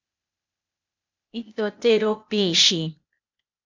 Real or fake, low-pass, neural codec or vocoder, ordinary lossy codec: fake; 7.2 kHz; codec, 16 kHz, 0.8 kbps, ZipCodec; MP3, 64 kbps